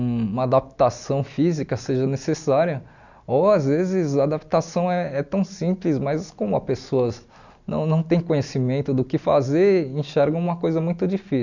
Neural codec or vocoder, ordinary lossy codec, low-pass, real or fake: none; none; 7.2 kHz; real